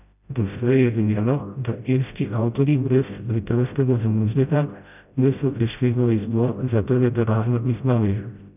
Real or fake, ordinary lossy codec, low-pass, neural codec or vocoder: fake; none; 3.6 kHz; codec, 16 kHz, 0.5 kbps, FreqCodec, smaller model